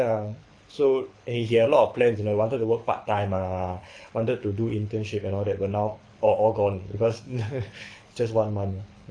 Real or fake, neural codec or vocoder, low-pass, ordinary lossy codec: fake; codec, 24 kHz, 6 kbps, HILCodec; 9.9 kHz; AAC, 48 kbps